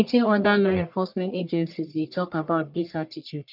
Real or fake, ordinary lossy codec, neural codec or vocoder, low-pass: fake; none; codec, 44.1 kHz, 1.7 kbps, Pupu-Codec; 5.4 kHz